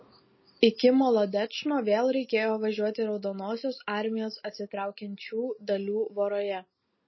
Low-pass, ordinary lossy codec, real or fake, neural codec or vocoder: 7.2 kHz; MP3, 24 kbps; real; none